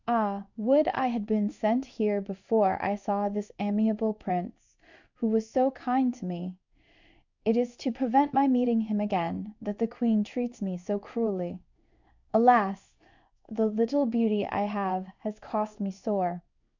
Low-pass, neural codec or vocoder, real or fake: 7.2 kHz; codec, 16 kHz in and 24 kHz out, 1 kbps, XY-Tokenizer; fake